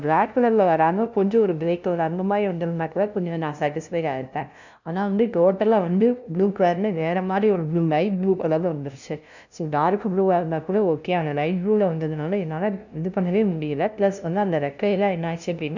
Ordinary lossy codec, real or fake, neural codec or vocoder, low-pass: none; fake; codec, 16 kHz, 0.5 kbps, FunCodec, trained on LibriTTS, 25 frames a second; 7.2 kHz